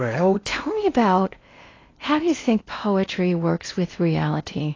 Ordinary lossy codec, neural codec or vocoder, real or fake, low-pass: AAC, 32 kbps; codec, 16 kHz in and 24 kHz out, 0.8 kbps, FocalCodec, streaming, 65536 codes; fake; 7.2 kHz